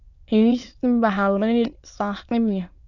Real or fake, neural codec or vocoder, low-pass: fake; autoencoder, 22.05 kHz, a latent of 192 numbers a frame, VITS, trained on many speakers; 7.2 kHz